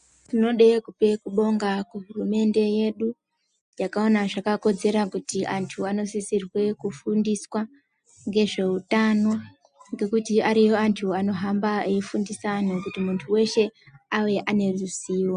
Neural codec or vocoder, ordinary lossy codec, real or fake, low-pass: none; AAC, 96 kbps; real; 9.9 kHz